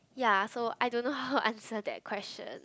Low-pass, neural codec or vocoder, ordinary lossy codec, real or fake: none; none; none; real